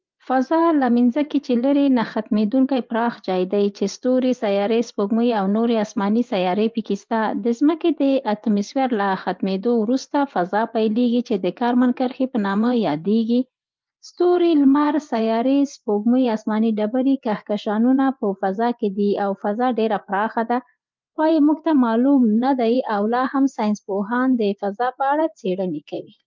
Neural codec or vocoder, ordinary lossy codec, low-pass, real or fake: vocoder, 24 kHz, 100 mel bands, Vocos; Opus, 24 kbps; 7.2 kHz; fake